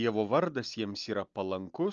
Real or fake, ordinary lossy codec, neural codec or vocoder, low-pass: real; Opus, 24 kbps; none; 7.2 kHz